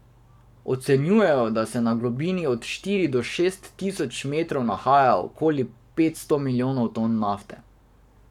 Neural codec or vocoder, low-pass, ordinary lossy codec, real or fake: codec, 44.1 kHz, 7.8 kbps, Pupu-Codec; 19.8 kHz; none; fake